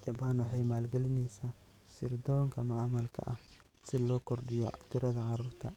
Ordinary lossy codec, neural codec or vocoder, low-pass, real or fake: none; codec, 44.1 kHz, 7.8 kbps, DAC; 19.8 kHz; fake